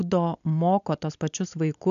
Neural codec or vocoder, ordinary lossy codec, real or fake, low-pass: none; MP3, 96 kbps; real; 7.2 kHz